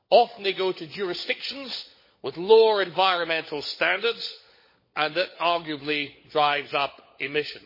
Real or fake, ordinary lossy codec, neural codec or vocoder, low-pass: fake; MP3, 24 kbps; codec, 16 kHz, 16 kbps, FunCodec, trained on LibriTTS, 50 frames a second; 5.4 kHz